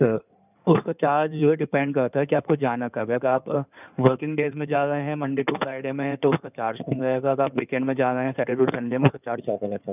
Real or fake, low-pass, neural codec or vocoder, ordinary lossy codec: fake; 3.6 kHz; codec, 16 kHz in and 24 kHz out, 2.2 kbps, FireRedTTS-2 codec; none